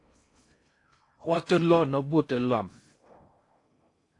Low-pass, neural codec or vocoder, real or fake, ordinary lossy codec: 10.8 kHz; codec, 16 kHz in and 24 kHz out, 0.6 kbps, FocalCodec, streaming, 4096 codes; fake; AAC, 48 kbps